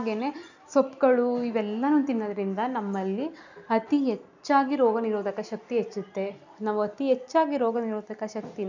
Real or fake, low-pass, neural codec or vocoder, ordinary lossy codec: real; 7.2 kHz; none; none